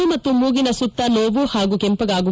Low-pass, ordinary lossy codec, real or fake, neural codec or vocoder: none; none; real; none